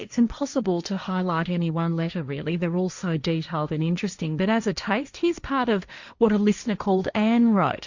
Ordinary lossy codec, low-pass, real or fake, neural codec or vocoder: Opus, 64 kbps; 7.2 kHz; fake; codec, 16 kHz, 1.1 kbps, Voila-Tokenizer